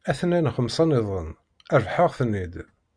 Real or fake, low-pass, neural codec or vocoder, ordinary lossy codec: real; 9.9 kHz; none; Opus, 64 kbps